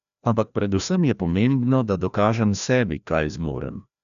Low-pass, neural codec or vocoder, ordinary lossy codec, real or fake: 7.2 kHz; codec, 16 kHz, 1 kbps, FreqCodec, larger model; none; fake